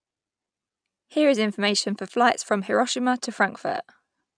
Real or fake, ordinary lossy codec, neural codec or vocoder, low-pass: real; none; none; 9.9 kHz